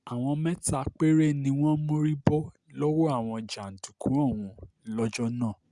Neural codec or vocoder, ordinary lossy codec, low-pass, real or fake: none; Opus, 64 kbps; 10.8 kHz; real